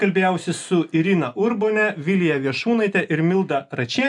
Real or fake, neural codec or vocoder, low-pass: real; none; 10.8 kHz